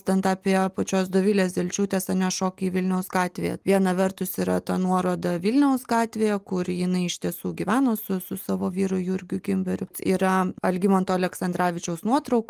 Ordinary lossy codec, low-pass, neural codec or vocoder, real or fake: Opus, 32 kbps; 14.4 kHz; none; real